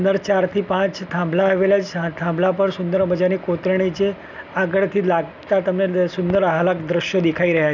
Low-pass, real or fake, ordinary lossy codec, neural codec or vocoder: 7.2 kHz; real; none; none